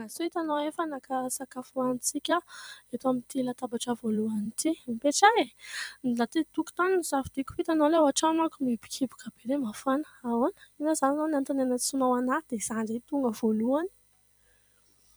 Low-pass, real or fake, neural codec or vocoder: 19.8 kHz; fake; vocoder, 44.1 kHz, 128 mel bands every 256 samples, BigVGAN v2